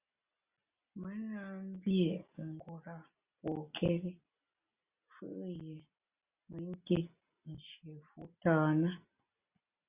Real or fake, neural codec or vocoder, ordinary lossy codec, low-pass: real; none; Opus, 64 kbps; 3.6 kHz